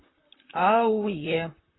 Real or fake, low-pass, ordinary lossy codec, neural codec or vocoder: fake; 7.2 kHz; AAC, 16 kbps; vocoder, 44.1 kHz, 128 mel bands, Pupu-Vocoder